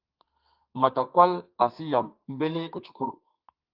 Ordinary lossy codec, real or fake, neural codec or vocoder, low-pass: Opus, 24 kbps; fake; codec, 32 kHz, 1.9 kbps, SNAC; 5.4 kHz